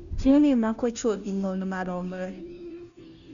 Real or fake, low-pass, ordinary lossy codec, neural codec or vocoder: fake; 7.2 kHz; none; codec, 16 kHz, 0.5 kbps, FunCodec, trained on Chinese and English, 25 frames a second